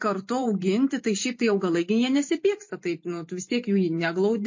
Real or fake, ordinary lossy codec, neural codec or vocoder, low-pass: fake; MP3, 32 kbps; vocoder, 44.1 kHz, 128 mel bands every 512 samples, BigVGAN v2; 7.2 kHz